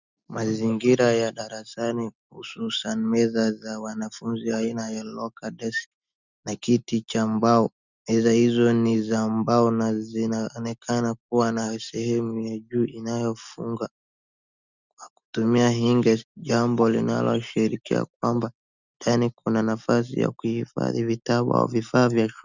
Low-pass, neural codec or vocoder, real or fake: 7.2 kHz; none; real